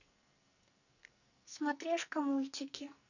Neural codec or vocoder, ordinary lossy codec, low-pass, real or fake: codec, 44.1 kHz, 2.6 kbps, SNAC; none; 7.2 kHz; fake